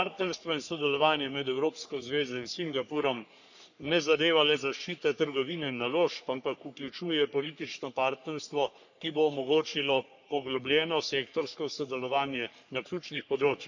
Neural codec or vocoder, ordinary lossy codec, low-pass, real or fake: codec, 44.1 kHz, 3.4 kbps, Pupu-Codec; none; 7.2 kHz; fake